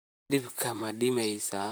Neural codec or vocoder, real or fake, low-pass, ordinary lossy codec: vocoder, 44.1 kHz, 128 mel bands, Pupu-Vocoder; fake; none; none